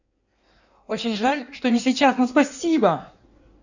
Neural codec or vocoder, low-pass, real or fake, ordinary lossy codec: codec, 16 kHz in and 24 kHz out, 1.1 kbps, FireRedTTS-2 codec; 7.2 kHz; fake; none